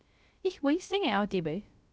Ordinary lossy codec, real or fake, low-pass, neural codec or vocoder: none; fake; none; codec, 16 kHz, 0.3 kbps, FocalCodec